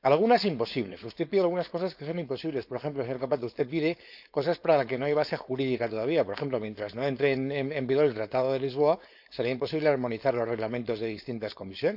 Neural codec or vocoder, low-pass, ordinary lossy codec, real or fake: codec, 16 kHz, 4.8 kbps, FACodec; 5.4 kHz; none; fake